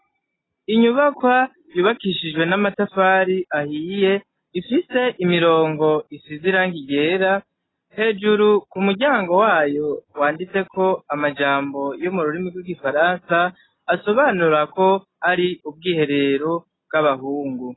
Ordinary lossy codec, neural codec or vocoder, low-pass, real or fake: AAC, 16 kbps; none; 7.2 kHz; real